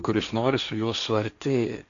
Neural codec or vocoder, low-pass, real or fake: codec, 16 kHz, 1.1 kbps, Voila-Tokenizer; 7.2 kHz; fake